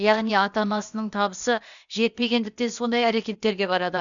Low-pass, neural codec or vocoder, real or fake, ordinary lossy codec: 7.2 kHz; codec, 16 kHz, 0.8 kbps, ZipCodec; fake; none